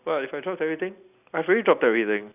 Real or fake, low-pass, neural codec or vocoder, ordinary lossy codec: real; 3.6 kHz; none; none